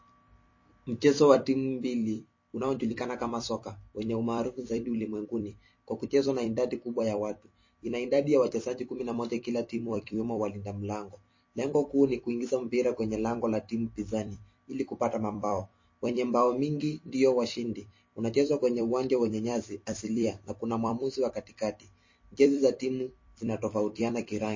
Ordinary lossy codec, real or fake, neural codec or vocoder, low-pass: MP3, 32 kbps; fake; vocoder, 44.1 kHz, 128 mel bands every 256 samples, BigVGAN v2; 7.2 kHz